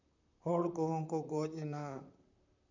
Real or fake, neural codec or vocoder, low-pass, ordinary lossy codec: fake; vocoder, 44.1 kHz, 128 mel bands, Pupu-Vocoder; 7.2 kHz; none